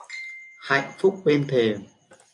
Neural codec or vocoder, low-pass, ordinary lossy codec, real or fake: none; 10.8 kHz; AAC, 64 kbps; real